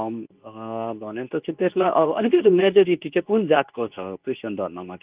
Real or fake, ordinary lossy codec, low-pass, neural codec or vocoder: fake; Opus, 32 kbps; 3.6 kHz; codec, 24 kHz, 0.9 kbps, WavTokenizer, medium speech release version 2